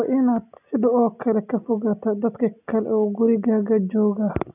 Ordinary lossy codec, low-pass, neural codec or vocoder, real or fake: none; 3.6 kHz; none; real